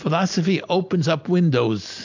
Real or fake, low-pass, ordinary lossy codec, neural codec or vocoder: real; 7.2 kHz; AAC, 48 kbps; none